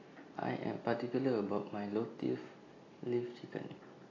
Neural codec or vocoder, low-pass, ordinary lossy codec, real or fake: none; 7.2 kHz; AAC, 32 kbps; real